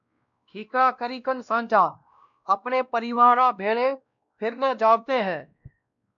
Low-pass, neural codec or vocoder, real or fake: 7.2 kHz; codec, 16 kHz, 1 kbps, X-Codec, WavLM features, trained on Multilingual LibriSpeech; fake